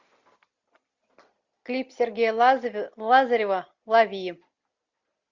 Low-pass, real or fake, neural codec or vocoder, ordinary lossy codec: 7.2 kHz; real; none; Opus, 64 kbps